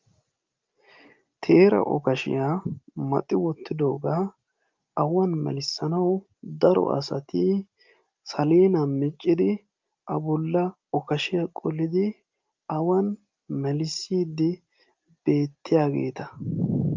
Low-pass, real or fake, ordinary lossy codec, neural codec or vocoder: 7.2 kHz; real; Opus, 24 kbps; none